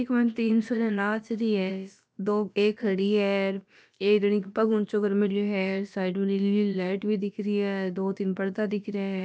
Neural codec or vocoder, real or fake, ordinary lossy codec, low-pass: codec, 16 kHz, about 1 kbps, DyCAST, with the encoder's durations; fake; none; none